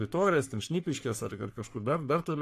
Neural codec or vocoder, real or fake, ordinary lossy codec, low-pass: codec, 44.1 kHz, 3.4 kbps, Pupu-Codec; fake; AAC, 64 kbps; 14.4 kHz